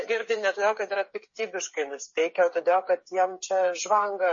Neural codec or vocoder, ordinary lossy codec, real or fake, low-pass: codec, 16 kHz, 8 kbps, FreqCodec, smaller model; MP3, 32 kbps; fake; 7.2 kHz